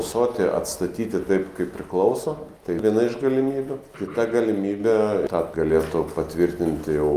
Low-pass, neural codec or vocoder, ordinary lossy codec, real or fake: 14.4 kHz; none; Opus, 32 kbps; real